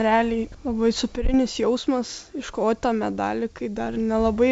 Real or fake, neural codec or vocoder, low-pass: real; none; 10.8 kHz